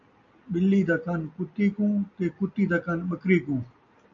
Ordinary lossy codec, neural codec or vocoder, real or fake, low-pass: MP3, 96 kbps; none; real; 7.2 kHz